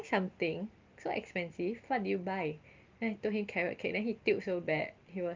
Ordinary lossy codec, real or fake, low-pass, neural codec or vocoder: Opus, 32 kbps; real; 7.2 kHz; none